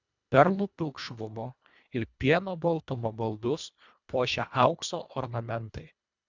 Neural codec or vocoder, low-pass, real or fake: codec, 24 kHz, 1.5 kbps, HILCodec; 7.2 kHz; fake